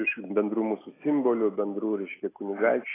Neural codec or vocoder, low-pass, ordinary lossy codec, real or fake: none; 3.6 kHz; AAC, 16 kbps; real